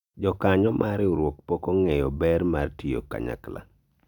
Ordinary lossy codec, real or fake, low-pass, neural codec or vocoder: none; real; 19.8 kHz; none